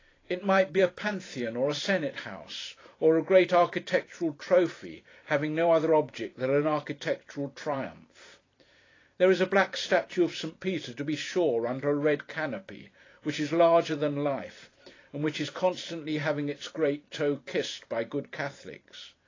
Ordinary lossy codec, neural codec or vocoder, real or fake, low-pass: AAC, 32 kbps; vocoder, 44.1 kHz, 128 mel bands every 512 samples, BigVGAN v2; fake; 7.2 kHz